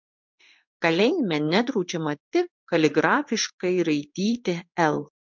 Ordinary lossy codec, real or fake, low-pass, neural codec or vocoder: MP3, 64 kbps; fake; 7.2 kHz; codec, 16 kHz in and 24 kHz out, 1 kbps, XY-Tokenizer